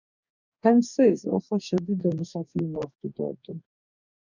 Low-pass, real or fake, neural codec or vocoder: 7.2 kHz; fake; codec, 44.1 kHz, 2.6 kbps, DAC